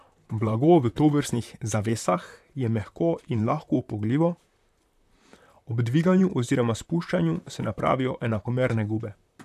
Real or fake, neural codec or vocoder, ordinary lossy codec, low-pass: fake; vocoder, 44.1 kHz, 128 mel bands, Pupu-Vocoder; none; 14.4 kHz